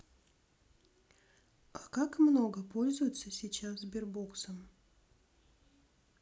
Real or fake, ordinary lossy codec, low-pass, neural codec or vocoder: real; none; none; none